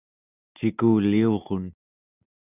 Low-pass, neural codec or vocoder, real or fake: 3.6 kHz; none; real